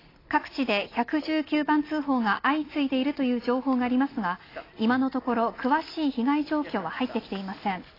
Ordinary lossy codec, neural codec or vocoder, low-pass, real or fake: AAC, 24 kbps; none; 5.4 kHz; real